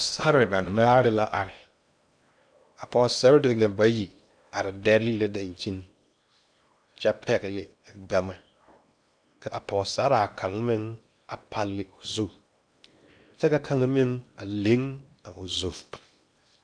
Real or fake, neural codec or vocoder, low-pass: fake; codec, 16 kHz in and 24 kHz out, 0.8 kbps, FocalCodec, streaming, 65536 codes; 9.9 kHz